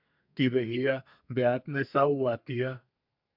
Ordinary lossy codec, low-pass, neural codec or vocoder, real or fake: MP3, 48 kbps; 5.4 kHz; codec, 32 kHz, 1.9 kbps, SNAC; fake